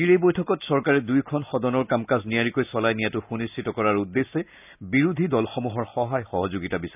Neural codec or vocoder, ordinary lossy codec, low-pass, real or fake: none; none; 3.6 kHz; real